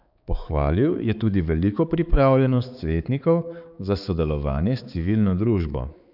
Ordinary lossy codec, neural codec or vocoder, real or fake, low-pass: none; codec, 16 kHz, 4 kbps, X-Codec, HuBERT features, trained on balanced general audio; fake; 5.4 kHz